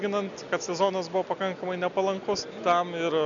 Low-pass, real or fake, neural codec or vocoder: 7.2 kHz; real; none